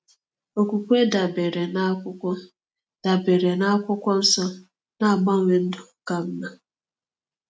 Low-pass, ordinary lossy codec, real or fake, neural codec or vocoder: none; none; real; none